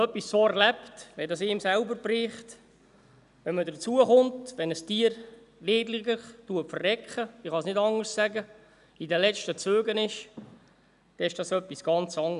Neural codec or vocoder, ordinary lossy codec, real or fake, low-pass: none; none; real; 10.8 kHz